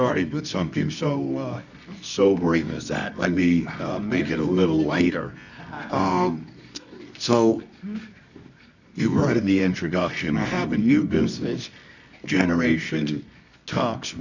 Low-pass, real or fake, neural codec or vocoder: 7.2 kHz; fake; codec, 24 kHz, 0.9 kbps, WavTokenizer, medium music audio release